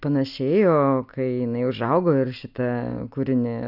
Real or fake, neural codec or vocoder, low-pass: real; none; 5.4 kHz